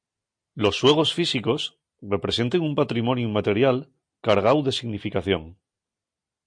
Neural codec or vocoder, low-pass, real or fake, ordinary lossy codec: none; 9.9 kHz; real; MP3, 48 kbps